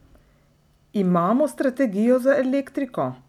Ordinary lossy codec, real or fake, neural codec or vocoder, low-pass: none; real; none; 19.8 kHz